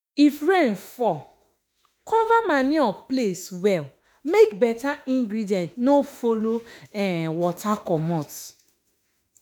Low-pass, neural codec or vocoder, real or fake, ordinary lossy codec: none; autoencoder, 48 kHz, 32 numbers a frame, DAC-VAE, trained on Japanese speech; fake; none